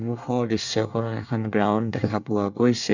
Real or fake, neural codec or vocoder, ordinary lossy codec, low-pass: fake; codec, 24 kHz, 1 kbps, SNAC; none; 7.2 kHz